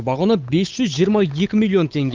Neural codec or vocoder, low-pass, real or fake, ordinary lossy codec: codec, 16 kHz, 8 kbps, FunCodec, trained on Chinese and English, 25 frames a second; 7.2 kHz; fake; Opus, 24 kbps